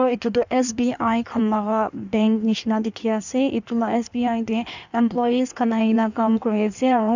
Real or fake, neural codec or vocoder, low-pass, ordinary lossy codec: fake; codec, 16 kHz in and 24 kHz out, 1.1 kbps, FireRedTTS-2 codec; 7.2 kHz; none